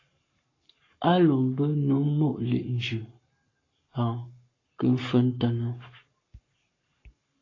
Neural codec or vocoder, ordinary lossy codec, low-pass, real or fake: codec, 44.1 kHz, 7.8 kbps, Pupu-Codec; AAC, 32 kbps; 7.2 kHz; fake